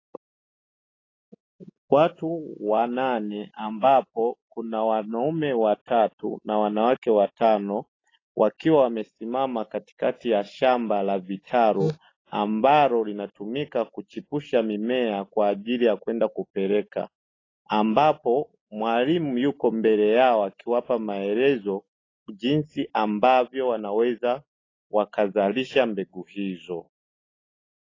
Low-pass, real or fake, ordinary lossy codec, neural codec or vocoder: 7.2 kHz; real; AAC, 32 kbps; none